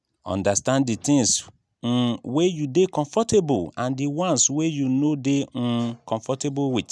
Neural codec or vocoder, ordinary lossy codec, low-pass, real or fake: none; none; none; real